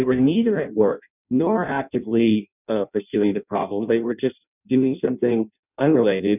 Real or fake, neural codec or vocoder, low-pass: fake; codec, 16 kHz in and 24 kHz out, 0.6 kbps, FireRedTTS-2 codec; 3.6 kHz